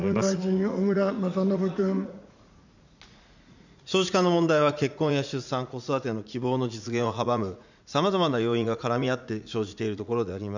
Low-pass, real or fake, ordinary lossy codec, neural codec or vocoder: 7.2 kHz; fake; none; vocoder, 44.1 kHz, 80 mel bands, Vocos